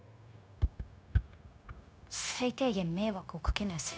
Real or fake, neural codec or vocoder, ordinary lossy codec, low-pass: fake; codec, 16 kHz, 0.9 kbps, LongCat-Audio-Codec; none; none